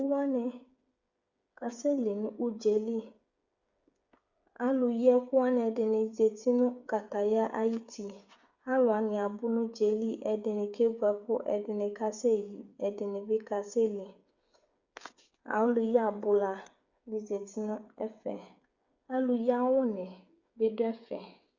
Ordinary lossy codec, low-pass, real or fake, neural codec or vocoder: Opus, 64 kbps; 7.2 kHz; fake; codec, 16 kHz, 8 kbps, FreqCodec, smaller model